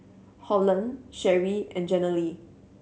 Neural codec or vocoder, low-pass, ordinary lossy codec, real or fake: none; none; none; real